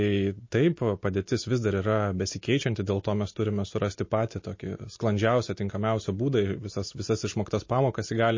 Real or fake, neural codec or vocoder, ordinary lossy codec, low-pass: real; none; MP3, 32 kbps; 7.2 kHz